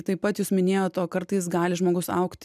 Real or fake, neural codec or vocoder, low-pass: real; none; 14.4 kHz